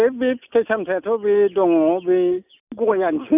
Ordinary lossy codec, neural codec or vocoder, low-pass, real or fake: none; none; 3.6 kHz; real